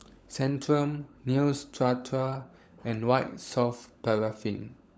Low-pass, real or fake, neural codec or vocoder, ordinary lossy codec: none; fake; codec, 16 kHz, 16 kbps, FunCodec, trained on LibriTTS, 50 frames a second; none